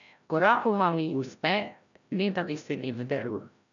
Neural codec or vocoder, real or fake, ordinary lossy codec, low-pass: codec, 16 kHz, 0.5 kbps, FreqCodec, larger model; fake; MP3, 96 kbps; 7.2 kHz